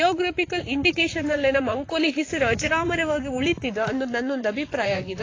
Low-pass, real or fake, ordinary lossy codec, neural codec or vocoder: 7.2 kHz; fake; AAC, 32 kbps; vocoder, 44.1 kHz, 128 mel bands, Pupu-Vocoder